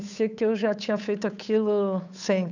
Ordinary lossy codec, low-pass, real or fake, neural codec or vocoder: none; 7.2 kHz; fake; codec, 16 kHz, 8 kbps, FunCodec, trained on Chinese and English, 25 frames a second